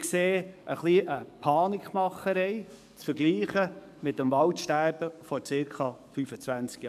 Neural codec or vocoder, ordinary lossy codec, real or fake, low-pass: codec, 44.1 kHz, 7.8 kbps, DAC; AAC, 96 kbps; fake; 14.4 kHz